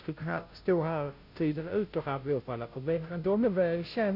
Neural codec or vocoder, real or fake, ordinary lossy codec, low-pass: codec, 16 kHz, 0.5 kbps, FunCodec, trained on Chinese and English, 25 frames a second; fake; none; 5.4 kHz